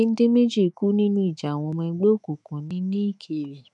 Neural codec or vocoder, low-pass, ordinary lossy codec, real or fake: autoencoder, 48 kHz, 128 numbers a frame, DAC-VAE, trained on Japanese speech; 10.8 kHz; none; fake